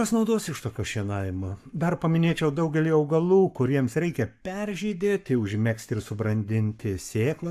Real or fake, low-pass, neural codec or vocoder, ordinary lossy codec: fake; 14.4 kHz; codec, 44.1 kHz, 7.8 kbps, Pupu-Codec; AAC, 96 kbps